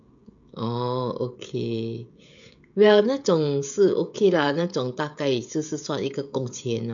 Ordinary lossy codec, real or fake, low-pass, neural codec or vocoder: none; fake; 7.2 kHz; codec, 16 kHz, 16 kbps, FreqCodec, smaller model